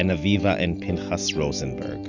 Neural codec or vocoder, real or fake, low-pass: none; real; 7.2 kHz